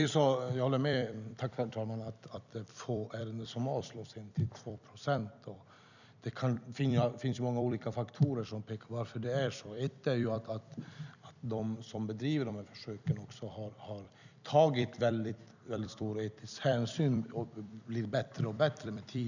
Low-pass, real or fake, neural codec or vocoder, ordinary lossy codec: 7.2 kHz; fake; vocoder, 44.1 kHz, 128 mel bands every 256 samples, BigVGAN v2; none